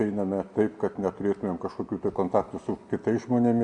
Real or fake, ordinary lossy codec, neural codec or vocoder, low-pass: real; MP3, 96 kbps; none; 9.9 kHz